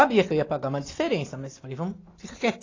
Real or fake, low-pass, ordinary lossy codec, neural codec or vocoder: real; 7.2 kHz; AAC, 32 kbps; none